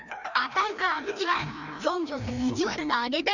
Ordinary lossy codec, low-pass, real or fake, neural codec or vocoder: none; 7.2 kHz; fake; codec, 16 kHz, 1 kbps, FreqCodec, larger model